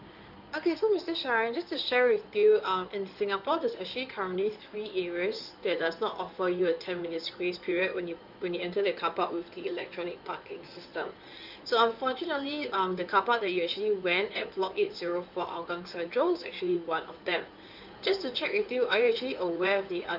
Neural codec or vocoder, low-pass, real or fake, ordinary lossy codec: codec, 16 kHz in and 24 kHz out, 2.2 kbps, FireRedTTS-2 codec; 5.4 kHz; fake; none